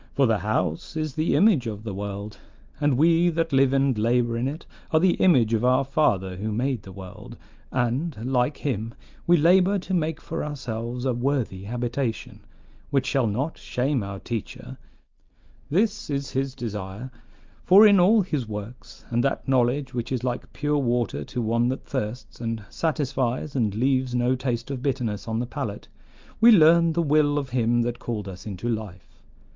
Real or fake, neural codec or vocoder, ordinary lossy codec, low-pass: real; none; Opus, 32 kbps; 7.2 kHz